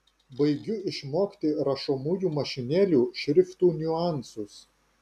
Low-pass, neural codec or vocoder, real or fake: 14.4 kHz; none; real